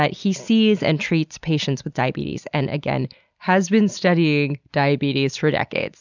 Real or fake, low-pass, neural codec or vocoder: real; 7.2 kHz; none